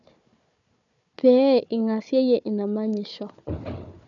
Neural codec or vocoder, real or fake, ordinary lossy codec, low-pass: codec, 16 kHz, 4 kbps, FunCodec, trained on Chinese and English, 50 frames a second; fake; none; 7.2 kHz